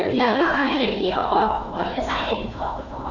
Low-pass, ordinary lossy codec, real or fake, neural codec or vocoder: 7.2 kHz; none; fake; codec, 16 kHz, 1 kbps, FunCodec, trained on Chinese and English, 50 frames a second